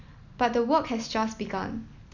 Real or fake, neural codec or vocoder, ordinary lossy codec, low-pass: real; none; none; 7.2 kHz